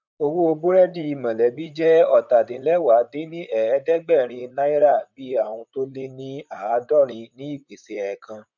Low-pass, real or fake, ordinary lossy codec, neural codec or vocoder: 7.2 kHz; fake; none; vocoder, 44.1 kHz, 128 mel bands, Pupu-Vocoder